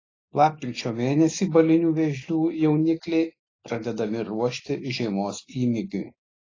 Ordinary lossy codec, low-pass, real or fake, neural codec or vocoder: AAC, 32 kbps; 7.2 kHz; real; none